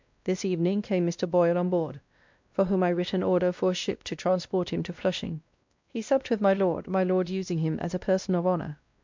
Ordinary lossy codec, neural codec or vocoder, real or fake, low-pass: MP3, 64 kbps; codec, 16 kHz, 1 kbps, X-Codec, WavLM features, trained on Multilingual LibriSpeech; fake; 7.2 kHz